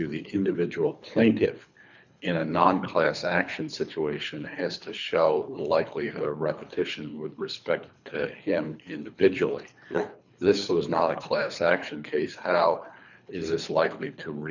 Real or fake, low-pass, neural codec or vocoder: fake; 7.2 kHz; codec, 24 kHz, 3 kbps, HILCodec